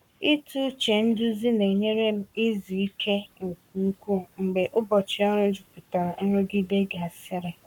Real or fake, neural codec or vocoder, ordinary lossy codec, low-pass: fake; codec, 44.1 kHz, 7.8 kbps, Pupu-Codec; none; 19.8 kHz